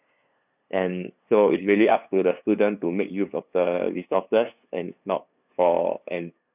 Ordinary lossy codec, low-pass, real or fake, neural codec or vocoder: none; 3.6 kHz; fake; codec, 16 kHz, 2 kbps, FunCodec, trained on LibriTTS, 25 frames a second